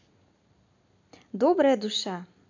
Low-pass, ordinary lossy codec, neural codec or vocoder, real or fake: 7.2 kHz; none; none; real